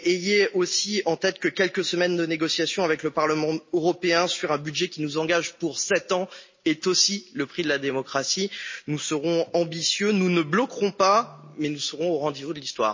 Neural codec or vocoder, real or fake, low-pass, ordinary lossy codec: none; real; 7.2 kHz; MP3, 32 kbps